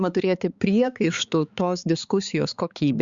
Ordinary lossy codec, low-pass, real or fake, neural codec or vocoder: Opus, 64 kbps; 7.2 kHz; fake; codec, 16 kHz, 4 kbps, X-Codec, HuBERT features, trained on balanced general audio